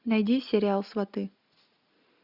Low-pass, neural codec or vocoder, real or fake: 5.4 kHz; none; real